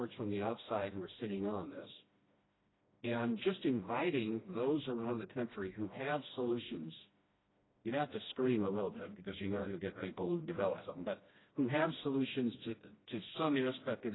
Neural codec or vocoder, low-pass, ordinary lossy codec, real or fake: codec, 16 kHz, 1 kbps, FreqCodec, smaller model; 7.2 kHz; AAC, 16 kbps; fake